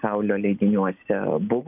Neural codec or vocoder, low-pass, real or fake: none; 3.6 kHz; real